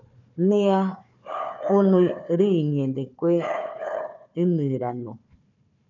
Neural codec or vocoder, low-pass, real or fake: codec, 16 kHz, 4 kbps, FunCodec, trained on Chinese and English, 50 frames a second; 7.2 kHz; fake